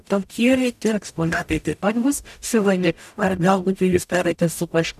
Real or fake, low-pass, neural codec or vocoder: fake; 14.4 kHz; codec, 44.1 kHz, 0.9 kbps, DAC